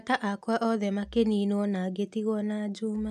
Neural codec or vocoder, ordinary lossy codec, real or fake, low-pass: none; none; real; 14.4 kHz